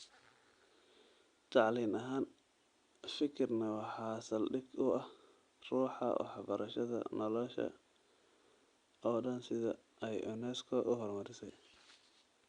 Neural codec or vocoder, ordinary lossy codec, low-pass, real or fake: none; Opus, 64 kbps; 9.9 kHz; real